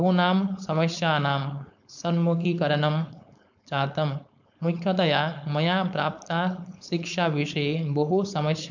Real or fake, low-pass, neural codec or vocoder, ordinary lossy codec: fake; 7.2 kHz; codec, 16 kHz, 4.8 kbps, FACodec; none